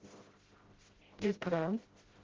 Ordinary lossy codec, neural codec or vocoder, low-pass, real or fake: Opus, 16 kbps; codec, 16 kHz, 0.5 kbps, FreqCodec, smaller model; 7.2 kHz; fake